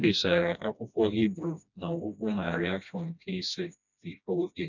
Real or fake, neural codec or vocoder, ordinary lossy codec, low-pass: fake; codec, 16 kHz, 1 kbps, FreqCodec, smaller model; none; 7.2 kHz